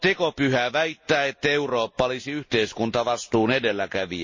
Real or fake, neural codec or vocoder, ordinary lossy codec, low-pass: real; none; MP3, 32 kbps; 7.2 kHz